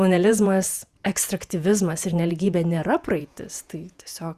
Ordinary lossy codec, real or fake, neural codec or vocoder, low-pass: Opus, 64 kbps; fake; vocoder, 48 kHz, 128 mel bands, Vocos; 14.4 kHz